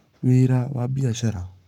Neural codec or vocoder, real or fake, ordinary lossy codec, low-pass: codec, 44.1 kHz, 7.8 kbps, Pupu-Codec; fake; none; 19.8 kHz